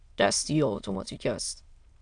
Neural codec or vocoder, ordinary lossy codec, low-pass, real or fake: autoencoder, 22.05 kHz, a latent of 192 numbers a frame, VITS, trained on many speakers; AAC, 64 kbps; 9.9 kHz; fake